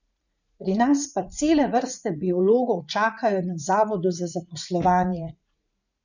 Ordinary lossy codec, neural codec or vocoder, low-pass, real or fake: none; vocoder, 44.1 kHz, 80 mel bands, Vocos; 7.2 kHz; fake